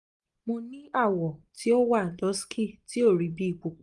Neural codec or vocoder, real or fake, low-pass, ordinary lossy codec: none; real; 10.8 kHz; Opus, 32 kbps